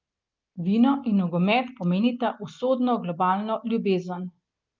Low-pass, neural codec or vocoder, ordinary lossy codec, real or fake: 7.2 kHz; none; Opus, 24 kbps; real